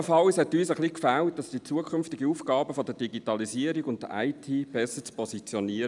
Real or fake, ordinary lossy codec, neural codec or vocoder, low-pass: real; none; none; 10.8 kHz